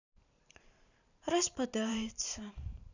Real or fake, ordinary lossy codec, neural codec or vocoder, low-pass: fake; none; vocoder, 44.1 kHz, 128 mel bands every 512 samples, BigVGAN v2; 7.2 kHz